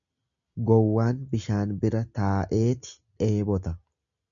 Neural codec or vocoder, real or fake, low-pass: none; real; 7.2 kHz